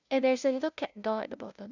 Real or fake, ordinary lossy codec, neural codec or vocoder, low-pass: fake; none; codec, 16 kHz, 0.5 kbps, FunCodec, trained on LibriTTS, 25 frames a second; 7.2 kHz